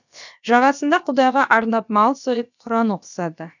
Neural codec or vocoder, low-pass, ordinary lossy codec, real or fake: codec, 16 kHz, about 1 kbps, DyCAST, with the encoder's durations; 7.2 kHz; none; fake